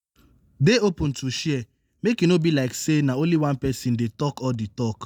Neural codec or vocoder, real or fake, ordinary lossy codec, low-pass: none; real; none; none